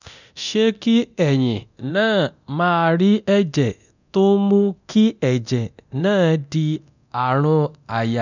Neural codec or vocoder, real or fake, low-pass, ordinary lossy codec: codec, 24 kHz, 0.9 kbps, DualCodec; fake; 7.2 kHz; none